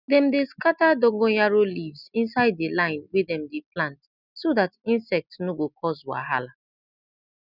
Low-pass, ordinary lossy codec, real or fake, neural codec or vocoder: 5.4 kHz; none; real; none